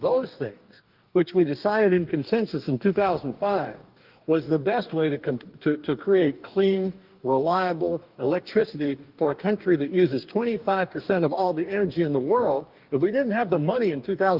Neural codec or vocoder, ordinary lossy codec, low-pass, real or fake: codec, 44.1 kHz, 2.6 kbps, DAC; Opus, 32 kbps; 5.4 kHz; fake